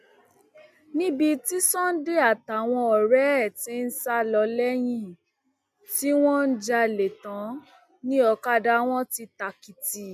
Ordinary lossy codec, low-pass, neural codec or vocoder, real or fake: MP3, 96 kbps; 14.4 kHz; none; real